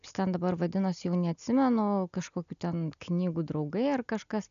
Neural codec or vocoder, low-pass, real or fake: none; 7.2 kHz; real